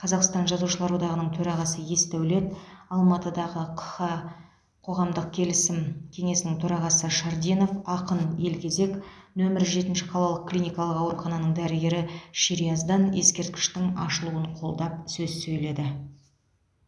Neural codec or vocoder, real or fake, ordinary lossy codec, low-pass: none; real; none; none